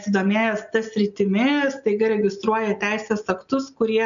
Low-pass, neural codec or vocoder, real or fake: 7.2 kHz; none; real